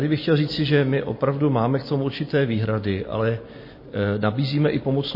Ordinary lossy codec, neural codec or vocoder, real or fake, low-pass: MP3, 24 kbps; none; real; 5.4 kHz